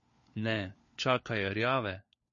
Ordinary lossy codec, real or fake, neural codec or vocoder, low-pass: MP3, 32 kbps; fake; codec, 16 kHz, 4 kbps, FunCodec, trained on LibriTTS, 50 frames a second; 7.2 kHz